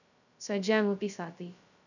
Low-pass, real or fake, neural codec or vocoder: 7.2 kHz; fake; codec, 16 kHz, 0.2 kbps, FocalCodec